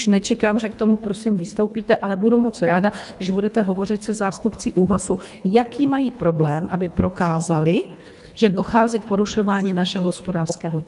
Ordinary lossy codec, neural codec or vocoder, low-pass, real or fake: AAC, 96 kbps; codec, 24 kHz, 1.5 kbps, HILCodec; 10.8 kHz; fake